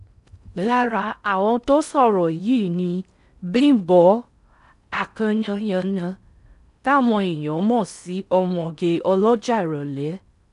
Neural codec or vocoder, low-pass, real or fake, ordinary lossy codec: codec, 16 kHz in and 24 kHz out, 0.6 kbps, FocalCodec, streaming, 4096 codes; 10.8 kHz; fake; none